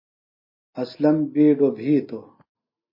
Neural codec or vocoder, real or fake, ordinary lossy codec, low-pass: none; real; MP3, 24 kbps; 5.4 kHz